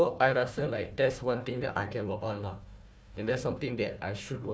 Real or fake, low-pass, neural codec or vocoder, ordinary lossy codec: fake; none; codec, 16 kHz, 1 kbps, FunCodec, trained on Chinese and English, 50 frames a second; none